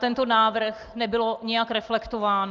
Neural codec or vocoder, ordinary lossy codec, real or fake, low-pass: none; Opus, 32 kbps; real; 7.2 kHz